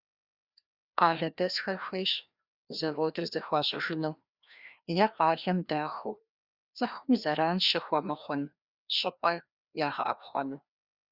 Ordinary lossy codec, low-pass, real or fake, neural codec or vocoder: Opus, 64 kbps; 5.4 kHz; fake; codec, 16 kHz, 1 kbps, FreqCodec, larger model